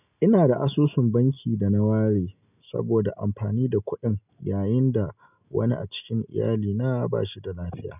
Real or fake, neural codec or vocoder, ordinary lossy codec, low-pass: real; none; none; 3.6 kHz